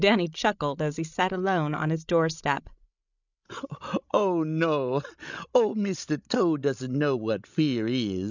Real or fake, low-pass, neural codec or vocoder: fake; 7.2 kHz; codec, 16 kHz, 16 kbps, FreqCodec, larger model